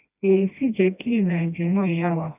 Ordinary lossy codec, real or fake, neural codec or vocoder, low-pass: none; fake; codec, 16 kHz, 1 kbps, FreqCodec, smaller model; 3.6 kHz